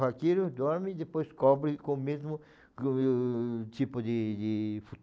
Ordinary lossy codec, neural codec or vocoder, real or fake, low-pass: none; none; real; none